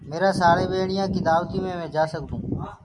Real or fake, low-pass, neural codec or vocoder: real; 10.8 kHz; none